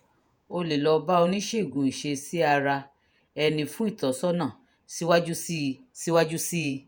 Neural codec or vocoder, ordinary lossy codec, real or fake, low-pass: vocoder, 48 kHz, 128 mel bands, Vocos; none; fake; none